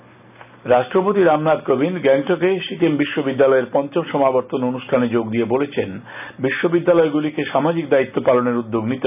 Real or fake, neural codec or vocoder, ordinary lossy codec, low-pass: real; none; none; 3.6 kHz